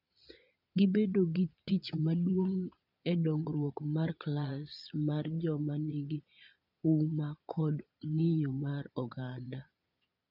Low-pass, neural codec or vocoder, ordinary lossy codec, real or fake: 5.4 kHz; vocoder, 22.05 kHz, 80 mel bands, Vocos; none; fake